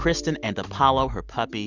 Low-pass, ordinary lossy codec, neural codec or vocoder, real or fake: 7.2 kHz; Opus, 64 kbps; none; real